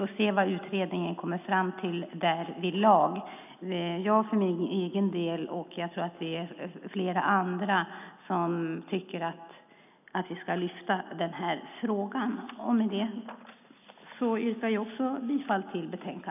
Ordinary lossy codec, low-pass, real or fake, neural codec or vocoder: none; 3.6 kHz; real; none